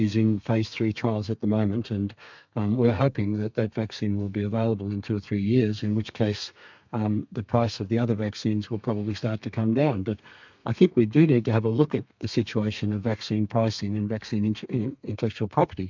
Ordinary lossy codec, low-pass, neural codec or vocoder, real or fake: MP3, 64 kbps; 7.2 kHz; codec, 32 kHz, 1.9 kbps, SNAC; fake